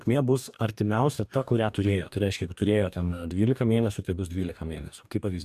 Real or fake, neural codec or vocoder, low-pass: fake; codec, 44.1 kHz, 2.6 kbps, DAC; 14.4 kHz